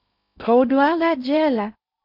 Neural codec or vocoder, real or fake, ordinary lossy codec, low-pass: codec, 16 kHz in and 24 kHz out, 0.6 kbps, FocalCodec, streaming, 2048 codes; fake; MP3, 48 kbps; 5.4 kHz